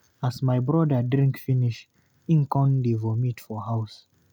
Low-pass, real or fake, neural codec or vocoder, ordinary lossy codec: 19.8 kHz; real; none; none